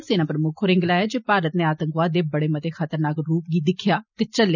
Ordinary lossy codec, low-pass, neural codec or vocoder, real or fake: none; 7.2 kHz; none; real